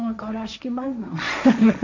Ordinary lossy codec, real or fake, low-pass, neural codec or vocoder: none; fake; 7.2 kHz; codec, 16 kHz, 1.1 kbps, Voila-Tokenizer